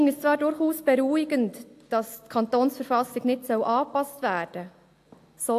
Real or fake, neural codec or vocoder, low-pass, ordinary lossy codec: real; none; 14.4 kHz; AAC, 64 kbps